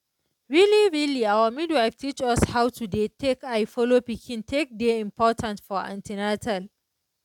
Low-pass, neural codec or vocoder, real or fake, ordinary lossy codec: 19.8 kHz; none; real; none